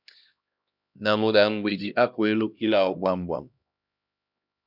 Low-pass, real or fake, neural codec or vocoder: 5.4 kHz; fake; codec, 16 kHz, 1 kbps, X-Codec, HuBERT features, trained on LibriSpeech